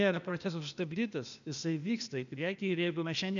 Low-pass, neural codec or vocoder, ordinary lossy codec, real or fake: 7.2 kHz; codec, 16 kHz, 0.8 kbps, ZipCodec; MP3, 96 kbps; fake